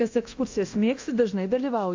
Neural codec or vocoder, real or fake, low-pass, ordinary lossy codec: codec, 24 kHz, 0.9 kbps, DualCodec; fake; 7.2 kHz; AAC, 48 kbps